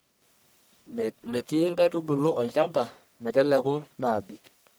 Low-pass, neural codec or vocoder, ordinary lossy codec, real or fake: none; codec, 44.1 kHz, 1.7 kbps, Pupu-Codec; none; fake